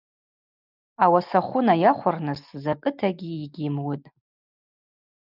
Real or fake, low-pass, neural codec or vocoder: real; 5.4 kHz; none